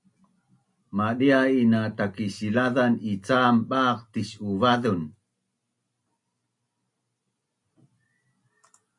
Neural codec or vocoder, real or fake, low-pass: none; real; 10.8 kHz